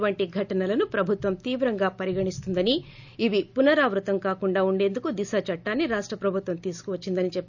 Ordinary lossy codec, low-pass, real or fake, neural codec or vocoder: none; 7.2 kHz; real; none